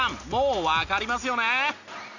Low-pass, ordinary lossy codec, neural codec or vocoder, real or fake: 7.2 kHz; none; none; real